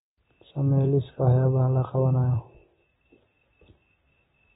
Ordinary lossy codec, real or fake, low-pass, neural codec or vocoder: AAC, 16 kbps; real; 19.8 kHz; none